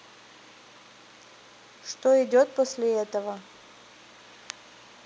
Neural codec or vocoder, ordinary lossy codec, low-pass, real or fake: none; none; none; real